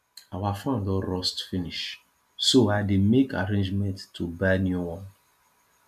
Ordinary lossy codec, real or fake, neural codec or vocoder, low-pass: AAC, 96 kbps; real; none; 14.4 kHz